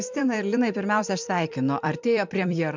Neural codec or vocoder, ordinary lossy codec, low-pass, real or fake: vocoder, 44.1 kHz, 128 mel bands every 256 samples, BigVGAN v2; MP3, 64 kbps; 7.2 kHz; fake